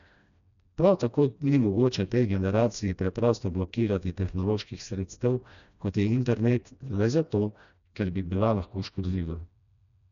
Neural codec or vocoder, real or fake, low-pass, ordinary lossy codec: codec, 16 kHz, 1 kbps, FreqCodec, smaller model; fake; 7.2 kHz; none